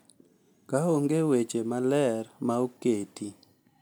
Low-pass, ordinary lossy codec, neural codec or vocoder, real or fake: none; none; none; real